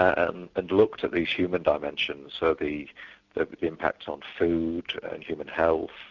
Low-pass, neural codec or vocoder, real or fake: 7.2 kHz; none; real